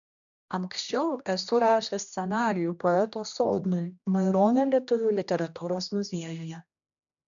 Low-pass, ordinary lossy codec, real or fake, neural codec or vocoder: 7.2 kHz; MP3, 96 kbps; fake; codec, 16 kHz, 1 kbps, X-Codec, HuBERT features, trained on general audio